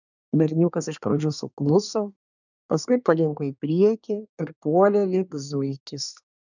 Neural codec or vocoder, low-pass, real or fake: codec, 24 kHz, 1 kbps, SNAC; 7.2 kHz; fake